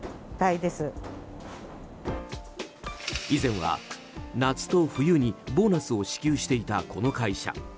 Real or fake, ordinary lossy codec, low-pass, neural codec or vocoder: real; none; none; none